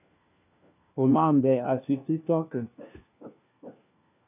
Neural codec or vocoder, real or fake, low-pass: codec, 16 kHz, 1 kbps, FunCodec, trained on LibriTTS, 50 frames a second; fake; 3.6 kHz